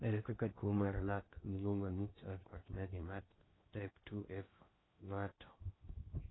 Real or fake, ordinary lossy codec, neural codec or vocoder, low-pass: fake; AAC, 16 kbps; codec, 16 kHz in and 24 kHz out, 0.6 kbps, FocalCodec, streaming, 4096 codes; 7.2 kHz